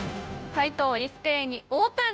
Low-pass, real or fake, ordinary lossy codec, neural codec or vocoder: none; fake; none; codec, 16 kHz, 0.5 kbps, FunCodec, trained on Chinese and English, 25 frames a second